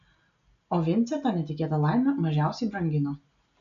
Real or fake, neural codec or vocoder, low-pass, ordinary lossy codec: real; none; 7.2 kHz; MP3, 96 kbps